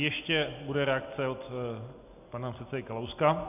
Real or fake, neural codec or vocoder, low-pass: real; none; 3.6 kHz